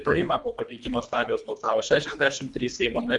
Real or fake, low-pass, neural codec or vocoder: fake; 10.8 kHz; codec, 24 kHz, 1.5 kbps, HILCodec